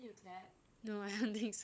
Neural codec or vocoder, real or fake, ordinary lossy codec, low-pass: codec, 16 kHz, 16 kbps, FunCodec, trained on LibriTTS, 50 frames a second; fake; none; none